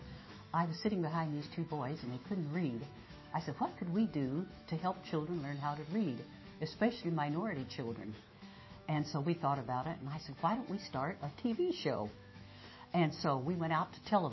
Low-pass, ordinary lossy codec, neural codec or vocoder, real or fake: 7.2 kHz; MP3, 24 kbps; autoencoder, 48 kHz, 128 numbers a frame, DAC-VAE, trained on Japanese speech; fake